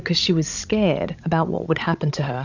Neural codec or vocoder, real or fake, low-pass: codec, 16 kHz, 16 kbps, FreqCodec, larger model; fake; 7.2 kHz